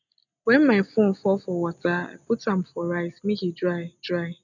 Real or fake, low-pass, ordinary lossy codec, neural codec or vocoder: real; 7.2 kHz; none; none